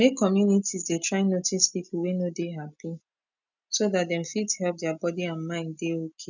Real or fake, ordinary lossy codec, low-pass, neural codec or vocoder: real; none; 7.2 kHz; none